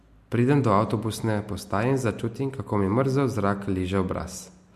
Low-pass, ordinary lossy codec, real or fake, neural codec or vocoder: 14.4 kHz; MP3, 64 kbps; real; none